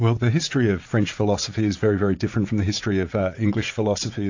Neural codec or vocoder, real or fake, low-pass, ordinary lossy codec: vocoder, 44.1 kHz, 80 mel bands, Vocos; fake; 7.2 kHz; AAC, 48 kbps